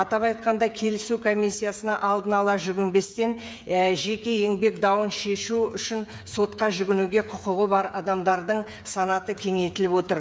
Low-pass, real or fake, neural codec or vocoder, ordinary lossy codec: none; fake; codec, 16 kHz, 8 kbps, FreqCodec, smaller model; none